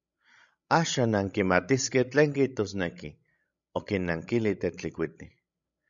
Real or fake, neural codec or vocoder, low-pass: fake; codec, 16 kHz, 16 kbps, FreqCodec, larger model; 7.2 kHz